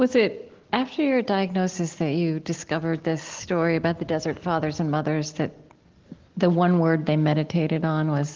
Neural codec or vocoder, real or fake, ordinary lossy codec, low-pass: none; real; Opus, 16 kbps; 7.2 kHz